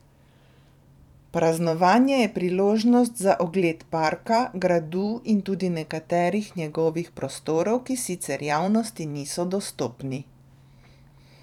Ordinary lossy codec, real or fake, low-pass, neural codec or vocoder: none; fake; 19.8 kHz; vocoder, 44.1 kHz, 128 mel bands every 512 samples, BigVGAN v2